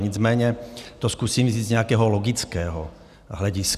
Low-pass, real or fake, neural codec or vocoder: 14.4 kHz; real; none